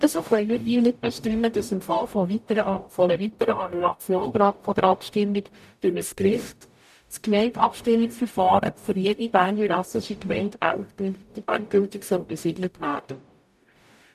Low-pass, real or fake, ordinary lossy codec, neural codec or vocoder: 14.4 kHz; fake; none; codec, 44.1 kHz, 0.9 kbps, DAC